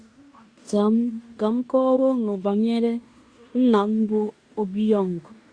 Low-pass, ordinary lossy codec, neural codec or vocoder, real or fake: 9.9 kHz; Opus, 64 kbps; codec, 16 kHz in and 24 kHz out, 0.9 kbps, LongCat-Audio-Codec, fine tuned four codebook decoder; fake